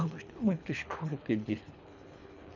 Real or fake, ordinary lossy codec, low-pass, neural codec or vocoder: fake; none; 7.2 kHz; codec, 24 kHz, 3 kbps, HILCodec